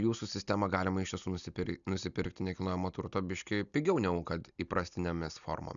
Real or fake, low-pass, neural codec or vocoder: real; 7.2 kHz; none